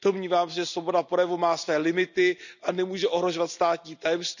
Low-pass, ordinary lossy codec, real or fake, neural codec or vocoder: 7.2 kHz; none; real; none